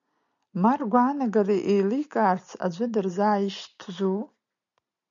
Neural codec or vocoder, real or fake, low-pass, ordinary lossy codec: none; real; 7.2 kHz; MP3, 96 kbps